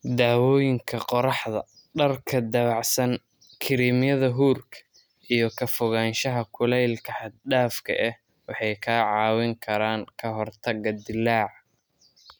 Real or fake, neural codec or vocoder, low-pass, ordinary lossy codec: real; none; none; none